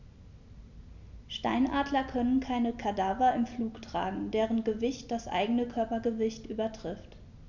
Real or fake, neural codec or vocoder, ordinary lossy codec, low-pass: real; none; none; 7.2 kHz